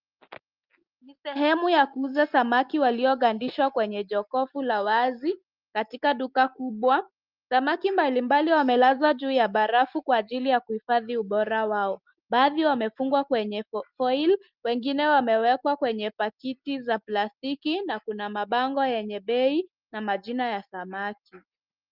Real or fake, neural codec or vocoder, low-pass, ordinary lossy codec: real; none; 5.4 kHz; Opus, 24 kbps